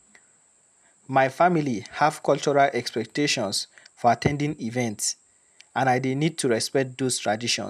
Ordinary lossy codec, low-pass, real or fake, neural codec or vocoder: none; 14.4 kHz; real; none